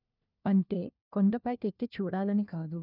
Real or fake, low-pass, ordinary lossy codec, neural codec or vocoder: fake; 5.4 kHz; none; codec, 16 kHz, 1 kbps, FunCodec, trained on LibriTTS, 50 frames a second